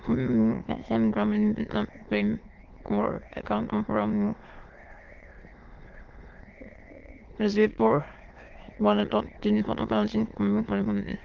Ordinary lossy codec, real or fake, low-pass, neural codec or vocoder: Opus, 16 kbps; fake; 7.2 kHz; autoencoder, 22.05 kHz, a latent of 192 numbers a frame, VITS, trained on many speakers